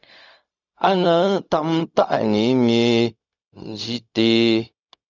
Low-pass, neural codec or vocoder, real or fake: 7.2 kHz; codec, 16 kHz, 0.4 kbps, LongCat-Audio-Codec; fake